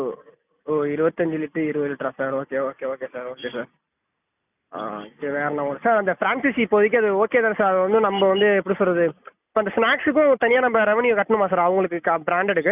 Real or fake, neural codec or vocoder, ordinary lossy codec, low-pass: real; none; none; 3.6 kHz